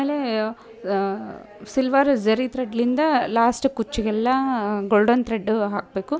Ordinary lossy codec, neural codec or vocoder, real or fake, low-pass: none; none; real; none